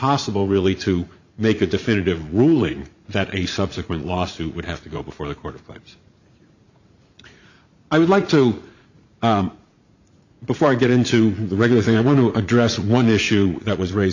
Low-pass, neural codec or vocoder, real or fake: 7.2 kHz; vocoder, 44.1 kHz, 80 mel bands, Vocos; fake